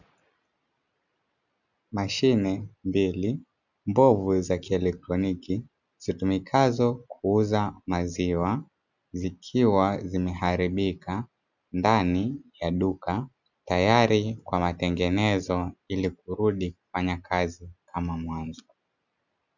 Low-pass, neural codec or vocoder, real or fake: 7.2 kHz; none; real